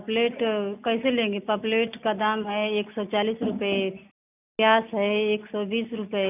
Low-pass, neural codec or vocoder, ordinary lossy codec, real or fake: 3.6 kHz; none; none; real